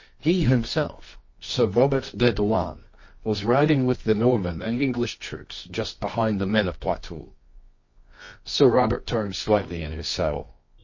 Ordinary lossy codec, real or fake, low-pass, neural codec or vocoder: MP3, 32 kbps; fake; 7.2 kHz; codec, 24 kHz, 0.9 kbps, WavTokenizer, medium music audio release